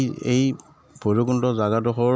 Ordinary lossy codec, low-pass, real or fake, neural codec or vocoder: none; none; real; none